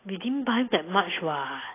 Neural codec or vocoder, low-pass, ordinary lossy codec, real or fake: none; 3.6 kHz; AAC, 24 kbps; real